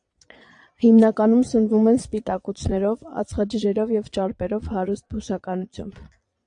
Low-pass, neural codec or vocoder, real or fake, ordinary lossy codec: 9.9 kHz; vocoder, 22.05 kHz, 80 mel bands, Vocos; fake; AAC, 64 kbps